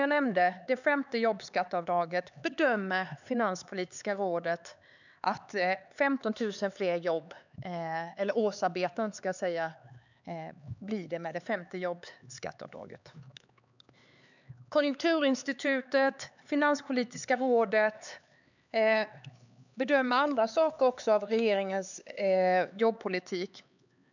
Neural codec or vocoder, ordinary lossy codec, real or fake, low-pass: codec, 16 kHz, 4 kbps, X-Codec, HuBERT features, trained on LibriSpeech; none; fake; 7.2 kHz